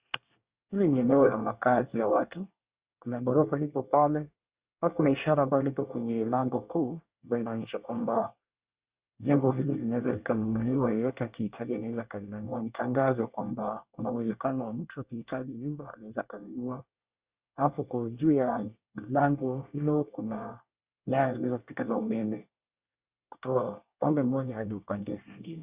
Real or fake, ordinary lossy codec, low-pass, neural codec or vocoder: fake; Opus, 64 kbps; 3.6 kHz; codec, 24 kHz, 1 kbps, SNAC